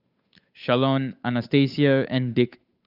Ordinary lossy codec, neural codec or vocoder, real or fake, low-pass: none; codec, 16 kHz, 8 kbps, FunCodec, trained on Chinese and English, 25 frames a second; fake; 5.4 kHz